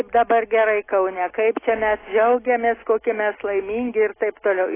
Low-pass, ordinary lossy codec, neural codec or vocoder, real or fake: 3.6 kHz; AAC, 16 kbps; none; real